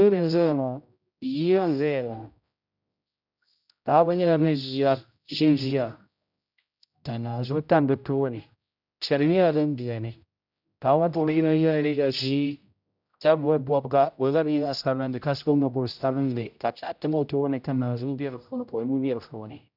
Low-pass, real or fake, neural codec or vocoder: 5.4 kHz; fake; codec, 16 kHz, 0.5 kbps, X-Codec, HuBERT features, trained on general audio